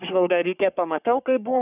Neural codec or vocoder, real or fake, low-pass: codec, 44.1 kHz, 3.4 kbps, Pupu-Codec; fake; 3.6 kHz